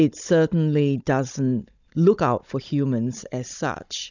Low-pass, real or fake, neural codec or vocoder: 7.2 kHz; fake; codec, 16 kHz, 16 kbps, FunCodec, trained on Chinese and English, 50 frames a second